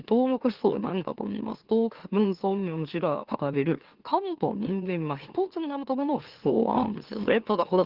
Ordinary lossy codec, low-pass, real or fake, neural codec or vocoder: Opus, 16 kbps; 5.4 kHz; fake; autoencoder, 44.1 kHz, a latent of 192 numbers a frame, MeloTTS